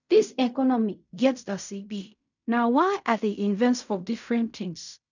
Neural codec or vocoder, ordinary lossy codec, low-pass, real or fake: codec, 16 kHz in and 24 kHz out, 0.4 kbps, LongCat-Audio-Codec, fine tuned four codebook decoder; none; 7.2 kHz; fake